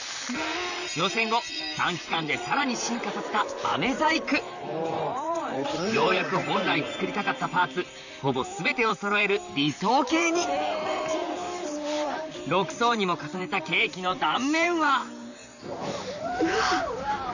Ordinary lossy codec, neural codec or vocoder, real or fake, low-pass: none; vocoder, 44.1 kHz, 128 mel bands, Pupu-Vocoder; fake; 7.2 kHz